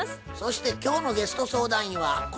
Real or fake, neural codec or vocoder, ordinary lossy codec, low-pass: real; none; none; none